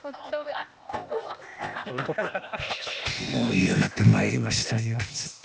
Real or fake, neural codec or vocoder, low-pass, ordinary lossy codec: fake; codec, 16 kHz, 0.8 kbps, ZipCodec; none; none